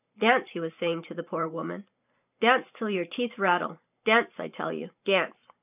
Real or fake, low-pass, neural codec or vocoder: fake; 3.6 kHz; vocoder, 44.1 kHz, 128 mel bands every 512 samples, BigVGAN v2